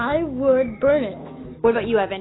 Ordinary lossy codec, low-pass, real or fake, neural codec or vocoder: AAC, 16 kbps; 7.2 kHz; fake; autoencoder, 48 kHz, 128 numbers a frame, DAC-VAE, trained on Japanese speech